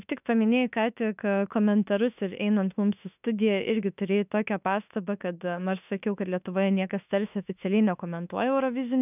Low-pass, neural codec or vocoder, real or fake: 3.6 kHz; autoencoder, 48 kHz, 32 numbers a frame, DAC-VAE, trained on Japanese speech; fake